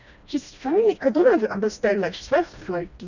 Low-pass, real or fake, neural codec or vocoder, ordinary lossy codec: 7.2 kHz; fake; codec, 16 kHz, 1 kbps, FreqCodec, smaller model; none